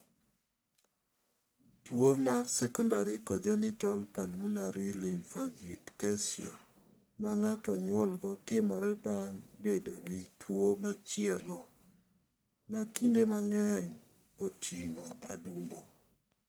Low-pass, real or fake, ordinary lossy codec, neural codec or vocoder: none; fake; none; codec, 44.1 kHz, 1.7 kbps, Pupu-Codec